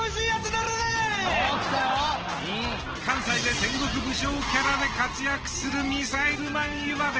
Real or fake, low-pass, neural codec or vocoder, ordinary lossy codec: real; 7.2 kHz; none; Opus, 16 kbps